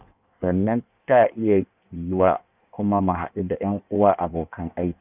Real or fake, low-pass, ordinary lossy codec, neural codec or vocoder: fake; 3.6 kHz; none; codec, 16 kHz in and 24 kHz out, 1.1 kbps, FireRedTTS-2 codec